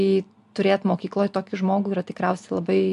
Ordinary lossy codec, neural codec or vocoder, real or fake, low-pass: AAC, 64 kbps; none; real; 10.8 kHz